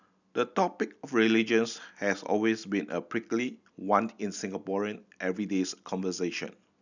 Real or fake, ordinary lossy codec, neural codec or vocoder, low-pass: real; none; none; 7.2 kHz